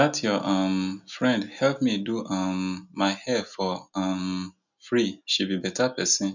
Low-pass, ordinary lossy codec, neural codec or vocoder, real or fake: 7.2 kHz; none; none; real